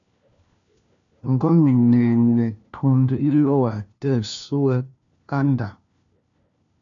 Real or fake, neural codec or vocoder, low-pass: fake; codec, 16 kHz, 1 kbps, FunCodec, trained on LibriTTS, 50 frames a second; 7.2 kHz